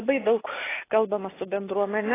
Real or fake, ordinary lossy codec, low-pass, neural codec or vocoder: real; AAC, 16 kbps; 3.6 kHz; none